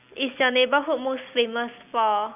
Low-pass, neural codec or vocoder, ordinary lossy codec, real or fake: 3.6 kHz; none; none; real